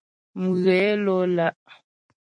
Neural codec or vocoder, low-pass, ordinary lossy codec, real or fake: vocoder, 44.1 kHz, 128 mel bands every 256 samples, BigVGAN v2; 9.9 kHz; MP3, 48 kbps; fake